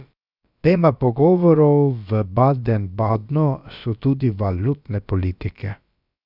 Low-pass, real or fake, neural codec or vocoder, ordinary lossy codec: 5.4 kHz; fake; codec, 16 kHz, about 1 kbps, DyCAST, with the encoder's durations; none